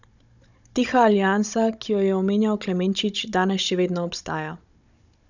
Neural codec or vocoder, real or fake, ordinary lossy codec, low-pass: codec, 16 kHz, 16 kbps, FunCodec, trained on Chinese and English, 50 frames a second; fake; none; 7.2 kHz